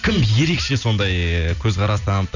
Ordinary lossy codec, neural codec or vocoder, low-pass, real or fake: none; none; 7.2 kHz; real